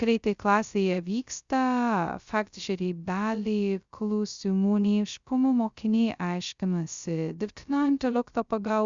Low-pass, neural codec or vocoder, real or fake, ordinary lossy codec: 7.2 kHz; codec, 16 kHz, 0.2 kbps, FocalCodec; fake; Opus, 64 kbps